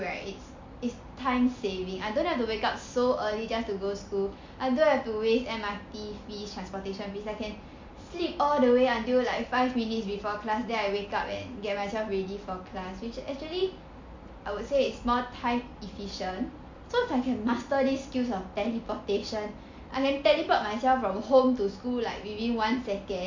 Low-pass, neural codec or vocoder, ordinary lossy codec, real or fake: 7.2 kHz; none; MP3, 48 kbps; real